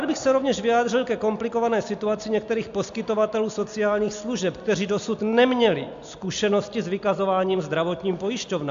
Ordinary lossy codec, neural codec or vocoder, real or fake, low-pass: AAC, 64 kbps; none; real; 7.2 kHz